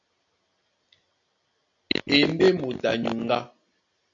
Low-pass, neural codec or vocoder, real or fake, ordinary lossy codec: 7.2 kHz; none; real; MP3, 48 kbps